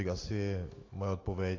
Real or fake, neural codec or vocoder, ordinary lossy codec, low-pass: real; none; AAC, 32 kbps; 7.2 kHz